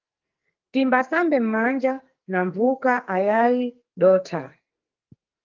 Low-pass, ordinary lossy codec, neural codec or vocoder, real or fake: 7.2 kHz; Opus, 24 kbps; codec, 44.1 kHz, 2.6 kbps, SNAC; fake